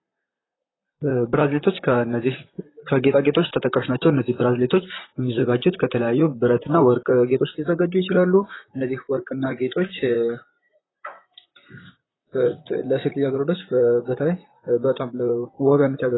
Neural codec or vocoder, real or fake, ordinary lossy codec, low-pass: vocoder, 44.1 kHz, 128 mel bands, Pupu-Vocoder; fake; AAC, 16 kbps; 7.2 kHz